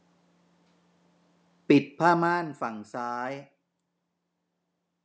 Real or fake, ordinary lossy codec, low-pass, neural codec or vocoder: real; none; none; none